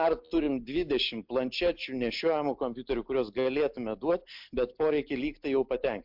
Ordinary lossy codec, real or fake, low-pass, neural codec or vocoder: MP3, 48 kbps; real; 5.4 kHz; none